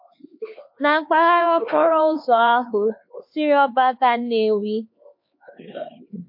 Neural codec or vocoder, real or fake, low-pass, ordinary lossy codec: codec, 16 kHz, 4 kbps, X-Codec, HuBERT features, trained on LibriSpeech; fake; 5.4 kHz; MP3, 32 kbps